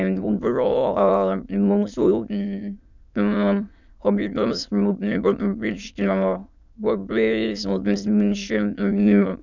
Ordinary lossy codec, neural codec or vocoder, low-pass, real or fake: none; autoencoder, 22.05 kHz, a latent of 192 numbers a frame, VITS, trained on many speakers; 7.2 kHz; fake